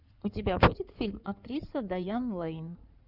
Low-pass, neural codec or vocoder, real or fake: 5.4 kHz; codec, 16 kHz in and 24 kHz out, 2.2 kbps, FireRedTTS-2 codec; fake